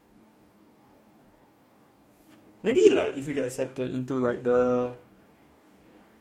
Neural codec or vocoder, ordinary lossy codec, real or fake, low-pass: codec, 44.1 kHz, 2.6 kbps, DAC; MP3, 64 kbps; fake; 19.8 kHz